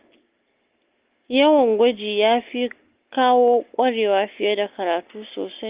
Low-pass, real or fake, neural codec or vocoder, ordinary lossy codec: 3.6 kHz; real; none; Opus, 32 kbps